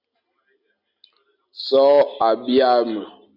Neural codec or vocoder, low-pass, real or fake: none; 5.4 kHz; real